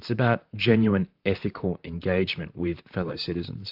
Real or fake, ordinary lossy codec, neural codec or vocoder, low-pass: fake; AAC, 48 kbps; vocoder, 44.1 kHz, 128 mel bands, Pupu-Vocoder; 5.4 kHz